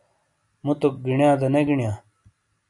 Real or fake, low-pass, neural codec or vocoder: real; 10.8 kHz; none